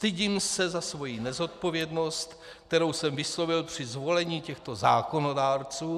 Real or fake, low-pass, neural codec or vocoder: real; 14.4 kHz; none